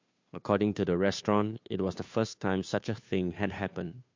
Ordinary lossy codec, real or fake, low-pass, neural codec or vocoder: MP3, 48 kbps; fake; 7.2 kHz; codec, 16 kHz, 2 kbps, FunCodec, trained on Chinese and English, 25 frames a second